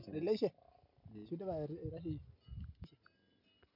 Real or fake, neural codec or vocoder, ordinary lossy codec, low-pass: real; none; none; 5.4 kHz